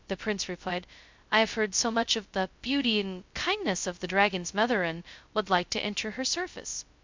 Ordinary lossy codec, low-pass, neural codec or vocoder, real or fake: MP3, 64 kbps; 7.2 kHz; codec, 16 kHz, 0.2 kbps, FocalCodec; fake